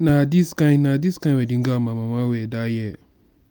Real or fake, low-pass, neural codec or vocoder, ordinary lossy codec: real; none; none; none